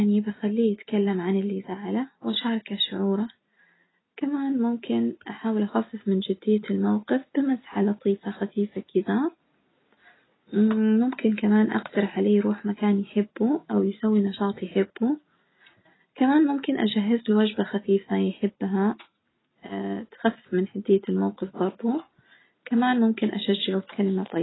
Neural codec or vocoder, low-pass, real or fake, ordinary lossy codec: none; 7.2 kHz; real; AAC, 16 kbps